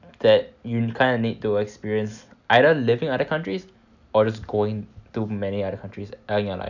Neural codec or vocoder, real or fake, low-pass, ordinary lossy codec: none; real; 7.2 kHz; none